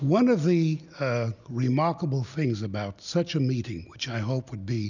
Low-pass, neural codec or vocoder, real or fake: 7.2 kHz; none; real